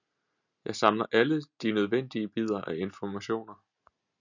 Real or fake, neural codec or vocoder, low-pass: real; none; 7.2 kHz